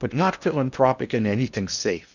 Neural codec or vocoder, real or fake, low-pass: codec, 16 kHz in and 24 kHz out, 0.8 kbps, FocalCodec, streaming, 65536 codes; fake; 7.2 kHz